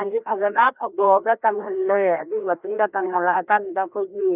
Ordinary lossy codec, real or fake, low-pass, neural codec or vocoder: none; fake; 3.6 kHz; codec, 16 kHz, 1 kbps, FreqCodec, larger model